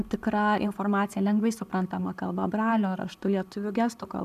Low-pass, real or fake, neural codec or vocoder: 14.4 kHz; real; none